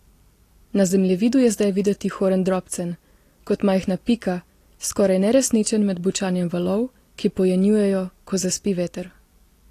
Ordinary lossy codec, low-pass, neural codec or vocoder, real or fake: AAC, 48 kbps; 14.4 kHz; none; real